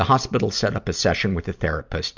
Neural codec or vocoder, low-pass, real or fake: none; 7.2 kHz; real